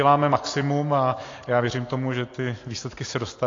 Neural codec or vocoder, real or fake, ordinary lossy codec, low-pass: none; real; AAC, 32 kbps; 7.2 kHz